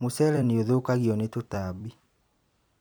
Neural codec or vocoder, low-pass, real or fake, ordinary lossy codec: vocoder, 44.1 kHz, 128 mel bands every 256 samples, BigVGAN v2; none; fake; none